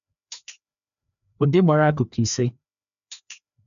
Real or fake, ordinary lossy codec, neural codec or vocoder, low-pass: fake; none; codec, 16 kHz, 2 kbps, FreqCodec, larger model; 7.2 kHz